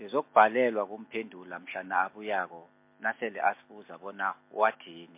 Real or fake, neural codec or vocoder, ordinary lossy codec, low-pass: real; none; MP3, 24 kbps; 3.6 kHz